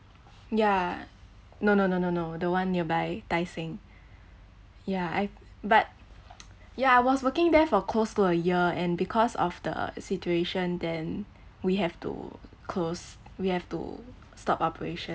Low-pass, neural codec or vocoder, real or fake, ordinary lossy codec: none; none; real; none